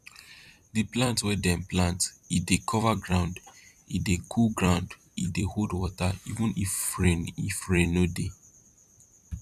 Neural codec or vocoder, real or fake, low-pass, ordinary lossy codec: vocoder, 44.1 kHz, 128 mel bands every 256 samples, BigVGAN v2; fake; 14.4 kHz; none